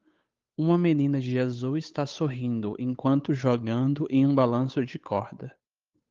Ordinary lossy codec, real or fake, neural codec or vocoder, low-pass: Opus, 32 kbps; fake; codec, 16 kHz, 8 kbps, FunCodec, trained on Chinese and English, 25 frames a second; 7.2 kHz